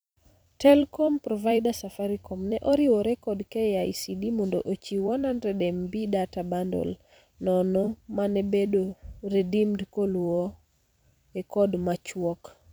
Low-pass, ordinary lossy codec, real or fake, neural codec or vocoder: none; none; fake; vocoder, 44.1 kHz, 128 mel bands every 256 samples, BigVGAN v2